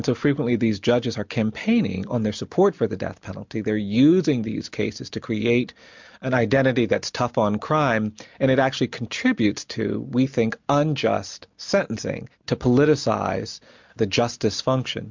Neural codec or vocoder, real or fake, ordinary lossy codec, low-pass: none; real; MP3, 64 kbps; 7.2 kHz